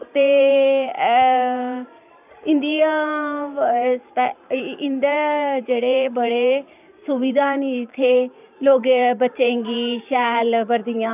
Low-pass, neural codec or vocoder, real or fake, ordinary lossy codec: 3.6 kHz; vocoder, 44.1 kHz, 128 mel bands every 512 samples, BigVGAN v2; fake; none